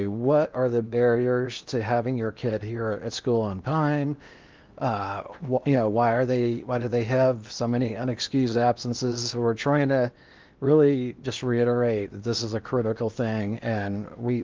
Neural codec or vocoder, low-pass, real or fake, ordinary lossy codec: codec, 16 kHz in and 24 kHz out, 0.8 kbps, FocalCodec, streaming, 65536 codes; 7.2 kHz; fake; Opus, 24 kbps